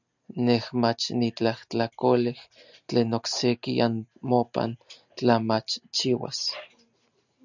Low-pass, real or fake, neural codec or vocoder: 7.2 kHz; real; none